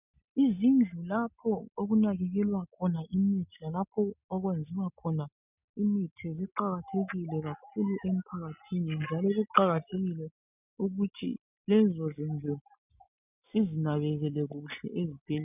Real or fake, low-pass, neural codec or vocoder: real; 3.6 kHz; none